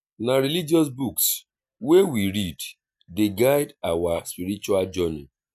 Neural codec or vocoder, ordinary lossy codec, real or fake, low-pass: none; none; real; 14.4 kHz